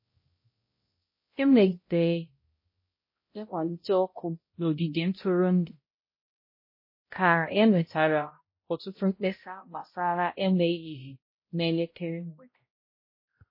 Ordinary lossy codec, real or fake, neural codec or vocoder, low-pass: MP3, 24 kbps; fake; codec, 16 kHz, 0.5 kbps, X-Codec, HuBERT features, trained on balanced general audio; 5.4 kHz